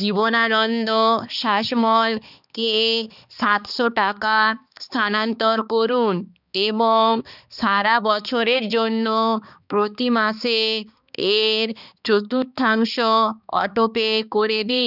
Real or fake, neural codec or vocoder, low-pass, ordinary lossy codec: fake; codec, 16 kHz, 2 kbps, X-Codec, HuBERT features, trained on balanced general audio; 5.4 kHz; none